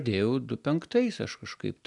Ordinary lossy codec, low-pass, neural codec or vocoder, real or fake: MP3, 96 kbps; 10.8 kHz; none; real